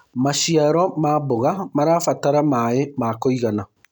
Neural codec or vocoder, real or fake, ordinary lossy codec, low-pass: none; real; none; 19.8 kHz